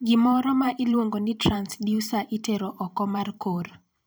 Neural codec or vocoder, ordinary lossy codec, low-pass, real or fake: vocoder, 44.1 kHz, 128 mel bands every 512 samples, BigVGAN v2; none; none; fake